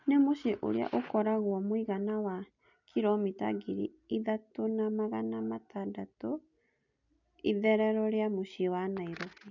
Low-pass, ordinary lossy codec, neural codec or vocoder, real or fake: 7.2 kHz; none; none; real